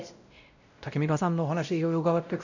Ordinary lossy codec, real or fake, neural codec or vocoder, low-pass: none; fake; codec, 16 kHz, 0.5 kbps, X-Codec, WavLM features, trained on Multilingual LibriSpeech; 7.2 kHz